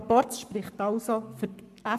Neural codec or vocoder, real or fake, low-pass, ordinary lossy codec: codec, 44.1 kHz, 7.8 kbps, Pupu-Codec; fake; 14.4 kHz; none